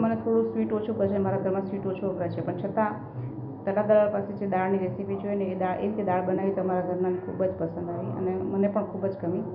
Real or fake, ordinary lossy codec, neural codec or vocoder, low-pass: real; none; none; 5.4 kHz